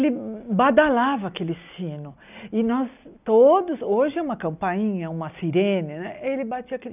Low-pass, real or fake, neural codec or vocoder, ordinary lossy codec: 3.6 kHz; real; none; none